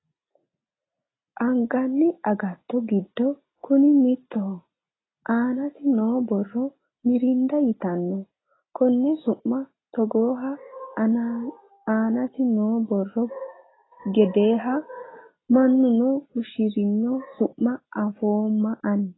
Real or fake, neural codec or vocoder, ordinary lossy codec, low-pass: real; none; AAC, 16 kbps; 7.2 kHz